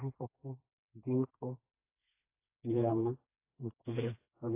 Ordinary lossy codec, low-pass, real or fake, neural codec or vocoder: AAC, 32 kbps; 3.6 kHz; fake; codec, 16 kHz, 2 kbps, FreqCodec, smaller model